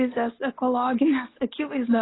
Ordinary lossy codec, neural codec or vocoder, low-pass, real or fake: AAC, 16 kbps; none; 7.2 kHz; real